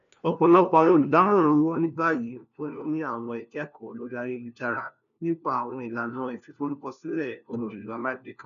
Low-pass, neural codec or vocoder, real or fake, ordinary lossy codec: 7.2 kHz; codec, 16 kHz, 1 kbps, FunCodec, trained on LibriTTS, 50 frames a second; fake; none